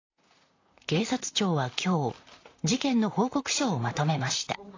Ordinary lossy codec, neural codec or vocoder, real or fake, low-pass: AAC, 32 kbps; vocoder, 44.1 kHz, 128 mel bands, Pupu-Vocoder; fake; 7.2 kHz